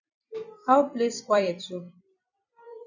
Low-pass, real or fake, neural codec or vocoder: 7.2 kHz; fake; vocoder, 44.1 kHz, 128 mel bands every 256 samples, BigVGAN v2